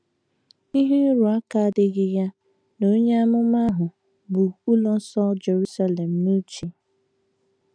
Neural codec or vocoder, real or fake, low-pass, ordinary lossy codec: none; real; 9.9 kHz; none